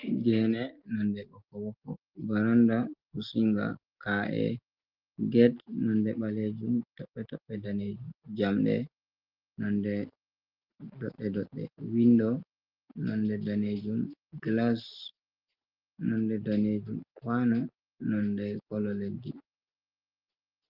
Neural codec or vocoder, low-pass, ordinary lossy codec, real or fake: none; 5.4 kHz; Opus, 32 kbps; real